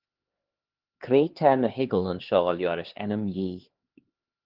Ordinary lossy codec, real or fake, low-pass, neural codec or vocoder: Opus, 16 kbps; fake; 5.4 kHz; codec, 16 kHz, 2 kbps, X-Codec, HuBERT features, trained on LibriSpeech